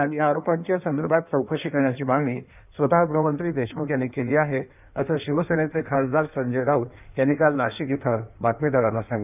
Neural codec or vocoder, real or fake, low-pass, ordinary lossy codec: codec, 16 kHz in and 24 kHz out, 1.1 kbps, FireRedTTS-2 codec; fake; 3.6 kHz; none